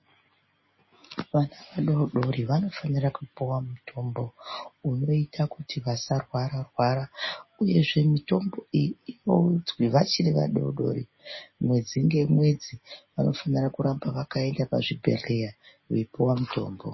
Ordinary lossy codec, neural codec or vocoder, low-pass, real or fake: MP3, 24 kbps; none; 7.2 kHz; real